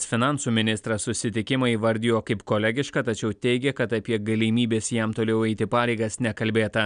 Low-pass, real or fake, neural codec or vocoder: 9.9 kHz; real; none